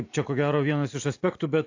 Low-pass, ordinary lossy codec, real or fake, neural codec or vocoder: 7.2 kHz; AAC, 48 kbps; real; none